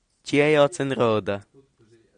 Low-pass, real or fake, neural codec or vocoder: 9.9 kHz; real; none